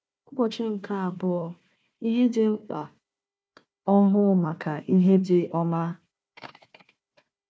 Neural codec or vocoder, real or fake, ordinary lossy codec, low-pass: codec, 16 kHz, 1 kbps, FunCodec, trained on Chinese and English, 50 frames a second; fake; none; none